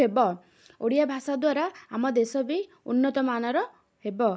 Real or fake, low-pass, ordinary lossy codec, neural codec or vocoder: real; none; none; none